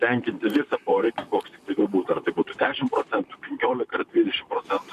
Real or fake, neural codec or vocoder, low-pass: fake; vocoder, 44.1 kHz, 128 mel bands, Pupu-Vocoder; 14.4 kHz